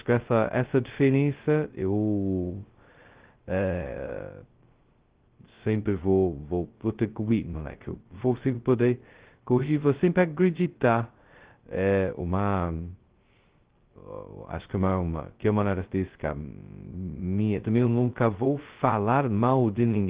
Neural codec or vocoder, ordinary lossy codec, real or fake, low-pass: codec, 16 kHz, 0.2 kbps, FocalCodec; Opus, 16 kbps; fake; 3.6 kHz